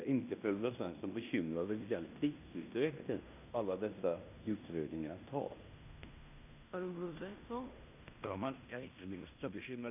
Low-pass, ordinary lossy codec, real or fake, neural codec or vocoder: 3.6 kHz; none; fake; codec, 16 kHz in and 24 kHz out, 0.9 kbps, LongCat-Audio-Codec, fine tuned four codebook decoder